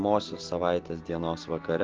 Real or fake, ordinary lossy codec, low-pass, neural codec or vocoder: real; Opus, 16 kbps; 7.2 kHz; none